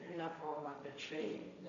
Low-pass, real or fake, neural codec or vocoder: 7.2 kHz; fake; codec, 16 kHz, 1.1 kbps, Voila-Tokenizer